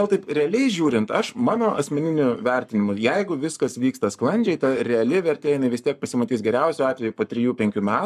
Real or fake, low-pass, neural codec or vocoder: fake; 14.4 kHz; codec, 44.1 kHz, 7.8 kbps, Pupu-Codec